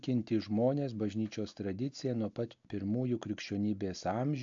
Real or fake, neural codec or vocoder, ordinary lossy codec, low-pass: real; none; AAC, 64 kbps; 7.2 kHz